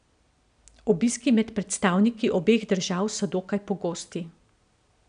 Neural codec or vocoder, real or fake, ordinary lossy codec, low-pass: none; real; none; 9.9 kHz